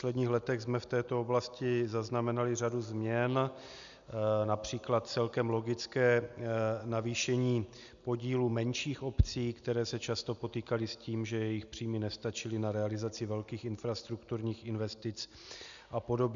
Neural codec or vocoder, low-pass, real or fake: none; 7.2 kHz; real